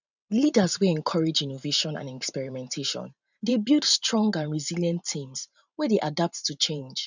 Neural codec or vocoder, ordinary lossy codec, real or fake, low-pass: vocoder, 44.1 kHz, 128 mel bands every 512 samples, BigVGAN v2; none; fake; 7.2 kHz